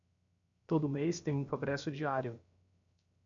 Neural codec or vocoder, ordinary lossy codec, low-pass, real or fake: codec, 16 kHz, 0.7 kbps, FocalCodec; MP3, 64 kbps; 7.2 kHz; fake